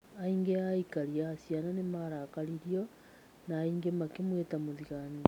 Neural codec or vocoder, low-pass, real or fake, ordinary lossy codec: none; 19.8 kHz; real; none